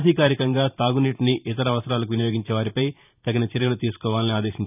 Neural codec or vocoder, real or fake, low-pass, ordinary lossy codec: vocoder, 44.1 kHz, 128 mel bands every 512 samples, BigVGAN v2; fake; 3.6 kHz; none